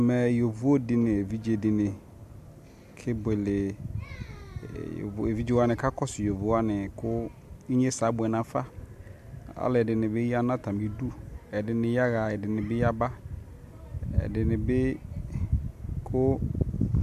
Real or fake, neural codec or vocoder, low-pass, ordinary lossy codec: real; none; 14.4 kHz; MP3, 64 kbps